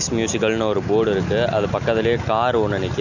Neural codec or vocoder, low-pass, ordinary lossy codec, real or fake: none; 7.2 kHz; none; real